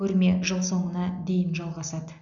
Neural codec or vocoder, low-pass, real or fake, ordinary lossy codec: none; 7.2 kHz; real; none